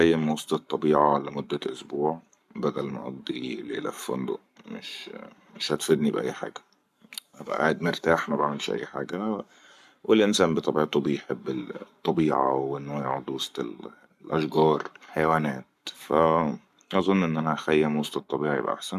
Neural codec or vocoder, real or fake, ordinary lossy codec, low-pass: codec, 44.1 kHz, 7.8 kbps, Pupu-Codec; fake; MP3, 96 kbps; 14.4 kHz